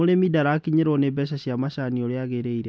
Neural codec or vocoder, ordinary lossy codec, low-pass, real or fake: none; none; none; real